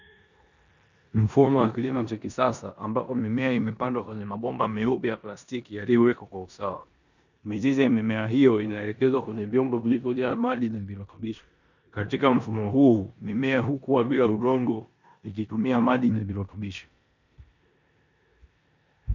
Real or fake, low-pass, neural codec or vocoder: fake; 7.2 kHz; codec, 16 kHz in and 24 kHz out, 0.9 kbps, LongCat-Audio-Codec, four codebook decoder